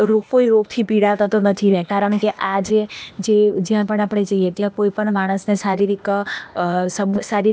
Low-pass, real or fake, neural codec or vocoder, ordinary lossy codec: none; fake; codec, 16 kHz, 0.8 kbps, ZipCodec; none